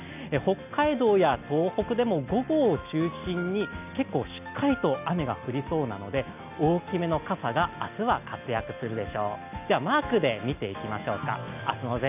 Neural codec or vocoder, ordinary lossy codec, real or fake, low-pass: none; none; real; 3.6 kHz